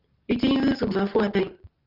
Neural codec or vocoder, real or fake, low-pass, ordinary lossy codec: none; real; 5.4 kHz; Opus, 32 kbps